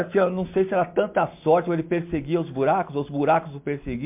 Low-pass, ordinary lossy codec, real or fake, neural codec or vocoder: 3.6 kHz; MP3, 32 kbps; real; none